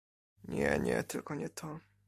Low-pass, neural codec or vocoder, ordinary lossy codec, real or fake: 14.4 kHz; none; MP3, 64 kbps; real